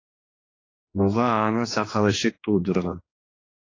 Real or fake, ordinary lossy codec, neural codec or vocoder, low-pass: fake; AAC, 32 kbps; codec, 16 kHz, 2 kbps, X-Codec, HuBERT features, trained on general audio; 7.2 kHz